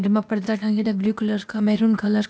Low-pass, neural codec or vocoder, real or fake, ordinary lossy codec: none; codec, 16 kHz, 0.8 kbps, ZipCodec; fake; none